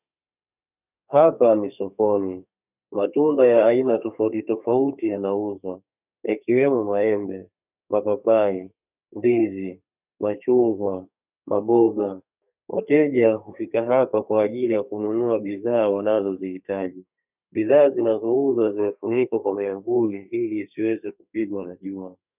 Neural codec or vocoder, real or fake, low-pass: codec, 32 kHz, 1.9 kbps, SNAC; fake; 3.6 kHz